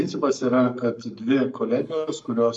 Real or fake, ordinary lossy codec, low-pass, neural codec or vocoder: fake; MP3, 64 kbps; 10.8 kHz; codec, 44.1 kHz, 7.8 kbps, Pupu-Codec